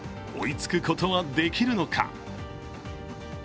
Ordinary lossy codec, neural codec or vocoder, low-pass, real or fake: none; none; none; real